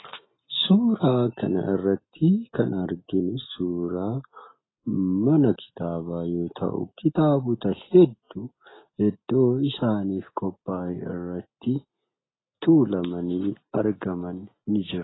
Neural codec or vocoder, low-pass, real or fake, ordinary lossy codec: none; 7.2 kHz; real; AAC, 16 kbps